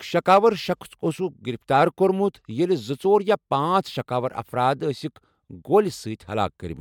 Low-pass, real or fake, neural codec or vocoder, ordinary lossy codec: 19.8 kHz; real; none; MP3, 96 kbps